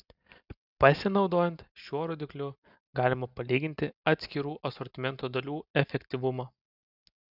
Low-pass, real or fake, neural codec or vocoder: 5.4 kHz; real; none